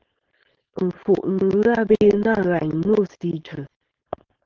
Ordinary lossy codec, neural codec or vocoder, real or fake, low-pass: Opus, 16 kbps; codec, 16 kHz, 4.8 kbps, FACodec; fake; 7.2 kHz